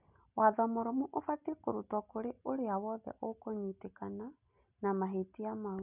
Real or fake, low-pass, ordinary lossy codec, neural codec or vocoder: real; 3.6 kHz; none; none